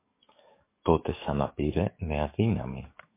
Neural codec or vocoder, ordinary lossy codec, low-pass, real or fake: codec, 44.1 kHz, 7.8 kbps, DAC; MP3, 24 kbps; 3.6 kHz; fake